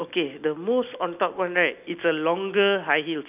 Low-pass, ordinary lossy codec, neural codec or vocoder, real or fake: 3.6 kHz; none; none; real